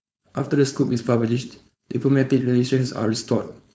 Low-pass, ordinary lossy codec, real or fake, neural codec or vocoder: none; none; fake; codec, 16 kHz, 4.8 kbps, FACodec